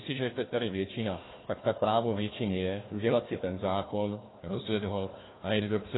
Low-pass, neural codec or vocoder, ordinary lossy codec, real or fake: 7.2 kHz; codec, 16 kHz, 1 kbps, FunCodec, trained on Chinese and English, 50 frames a second; AAC, 16 kbps; fake